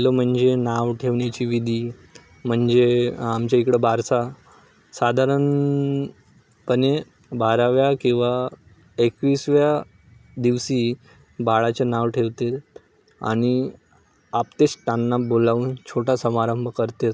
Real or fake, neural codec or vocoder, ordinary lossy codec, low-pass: real; none; none; none